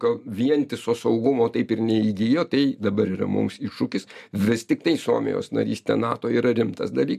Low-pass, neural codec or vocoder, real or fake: 14.4 kHz; none; real